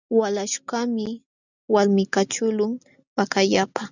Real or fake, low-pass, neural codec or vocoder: real; 7.2 kHz; none